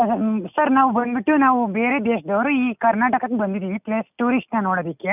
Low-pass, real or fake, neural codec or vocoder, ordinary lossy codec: 3.6 kHz; real; none; none